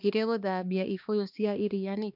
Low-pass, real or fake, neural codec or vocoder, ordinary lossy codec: 5.4 kHz; fake; codec, 16 kHz, 2 kbps, X-Codec, HuBERT features, trained on balanced general audio; none